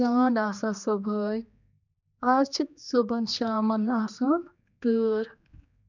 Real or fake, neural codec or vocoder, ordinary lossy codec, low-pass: fake; codec, 16 kHz, 2 kbps, X-Codec, HuBERT features, trained on general audio; none; 7.2 kHz